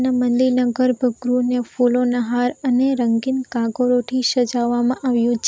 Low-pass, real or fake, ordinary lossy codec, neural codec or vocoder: none; real; none; none